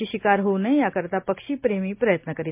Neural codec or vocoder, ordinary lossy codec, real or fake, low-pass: none; MP3, 32 kbps; real; 3.6 kHz